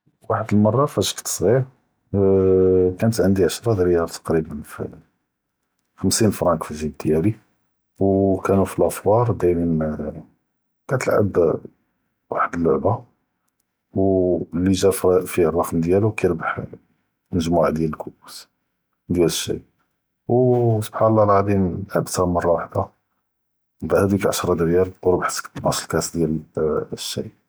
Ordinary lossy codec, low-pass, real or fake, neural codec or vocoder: none; none; real; none